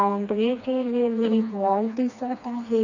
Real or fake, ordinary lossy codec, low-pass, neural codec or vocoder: fake; none; 7.2 kHz; codec, 16 kHz, 2 kbps, FreqCodec, smaller model